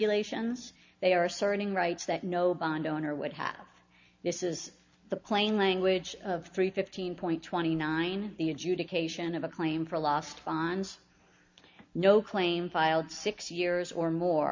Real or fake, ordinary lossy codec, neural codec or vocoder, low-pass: real; MP3, 64 kbps; none; 7.2 kHz